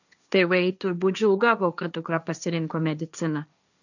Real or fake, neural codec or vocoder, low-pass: fake; codec, 16 kHz, 1.1 kbps, Voila-Tokenizer; 7.2 kHz